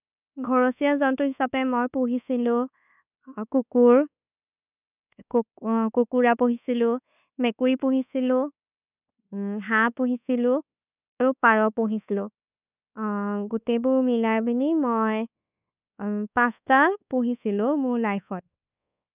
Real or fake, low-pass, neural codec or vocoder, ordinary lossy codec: fake; 3.6 kHz; codec, 24 kHz, 1.2 kbps, DualCodec; none